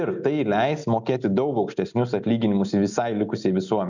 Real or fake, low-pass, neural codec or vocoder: real; 7.2 kHz; none